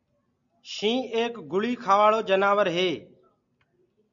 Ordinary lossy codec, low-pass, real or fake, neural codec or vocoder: MP3, 96 kbps; 7.2 kHz; real; none